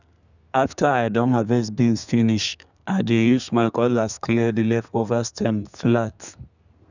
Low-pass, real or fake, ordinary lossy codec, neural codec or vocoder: 7.2 kHz; fake; none; codec, 32 kHz, 1.9 kbps, SNAC